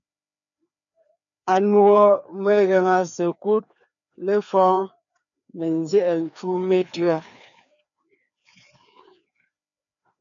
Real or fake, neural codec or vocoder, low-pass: fake; codec, 16 kHz, 2 kbps, FreqCodec, larger model; 7.2 kHz